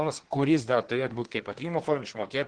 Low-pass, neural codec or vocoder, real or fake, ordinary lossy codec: 9.9 kHz; codec, 24 kHz, 1 kbps, SNAC; fake; Opus, 16 kbps